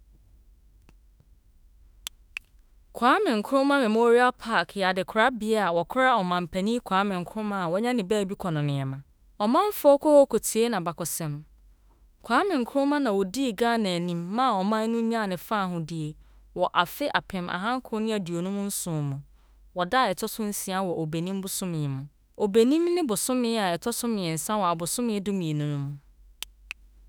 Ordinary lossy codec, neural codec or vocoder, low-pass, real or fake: none; autoencoder, 48 kHz, 32 numbers a frame, DAC-VAE, trained on Japanese speech; none; fake